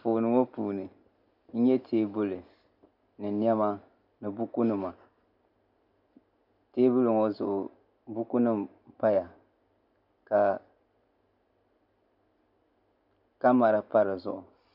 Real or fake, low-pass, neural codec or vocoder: real; 5.4 kHz; none